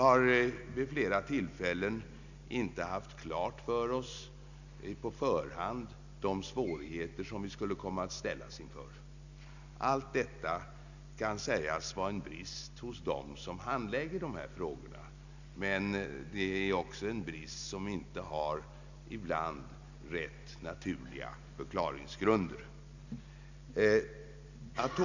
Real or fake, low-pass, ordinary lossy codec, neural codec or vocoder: real; 7.2 kHz; none; none